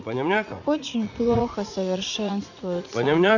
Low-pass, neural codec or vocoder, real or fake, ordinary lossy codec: 7.2 kHz; vocoder, 44.1 kHz, 80 mel bands, Vocos; fake; none